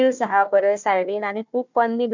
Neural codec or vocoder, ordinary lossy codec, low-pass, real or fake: codec, 16 kHz, 1 kbps, FunCodec, trained on Chinese and English, 50 frames a second; MP3, 64 kbps; 7.2 kHz; fake